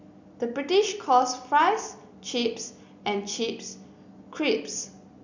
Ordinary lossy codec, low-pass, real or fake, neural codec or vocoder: none; 7.2 kHz; real; none